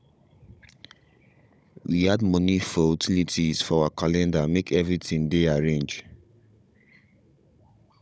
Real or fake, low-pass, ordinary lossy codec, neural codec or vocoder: fake; none; none; codec, 16 kHz, 16 kbps, FunCodec, trained on Chinese and English, 50 frames a second